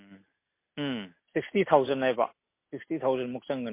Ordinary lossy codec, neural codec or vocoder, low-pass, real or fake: MP3, 24 kbps; none; 3.6 kHz; real